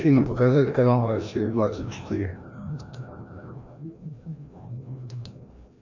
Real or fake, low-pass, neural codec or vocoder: fake; 7.2 kHz; codec, 16 kHz, 1 kbps, FreqCodec, larger model